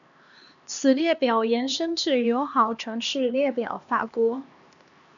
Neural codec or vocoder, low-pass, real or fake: codec, 16 kHz, 2 kbps, X-Codec, HuBERT features, trained on LibriSpeech; 7.2 kHz; fake